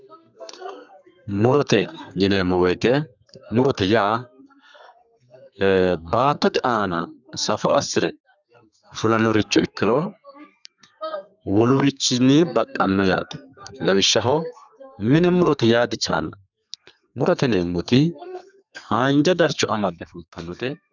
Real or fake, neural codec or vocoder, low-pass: fake; codec, 32 kHz, 1.9 kbps, SNAC; 7.2 kHz